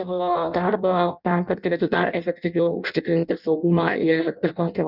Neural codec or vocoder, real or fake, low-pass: codec, 16 kHz in and 24 kHz out, 0.6 kbps, FireRedTTS-2 codec; fake; 5.4 kHz